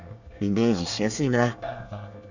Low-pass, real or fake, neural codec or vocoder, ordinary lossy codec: 7.2 kHz; fake; codec, 24 kHz, 1 kbps, SNAC; none